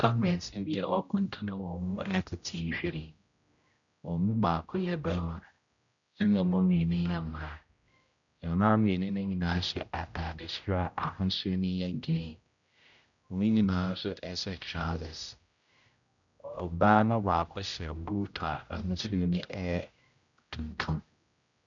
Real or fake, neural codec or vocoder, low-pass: fake; codec, 16 kHz, 0.5 kbps, X-Codec, HuBERT features, trained on general audio; 7.2 kHz